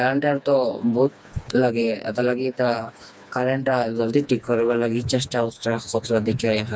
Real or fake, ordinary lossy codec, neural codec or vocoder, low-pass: fake; none; codec, 16 kHz, 2 kbps, FreqCodec, smaller model; none